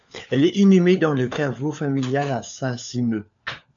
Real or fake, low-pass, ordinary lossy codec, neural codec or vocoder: fake; 7.2 kHz; MP3, 64 kbps; codec, 16 kHz, 4 kbps, FunCodec, trained on LibriTTS, 50 frames a second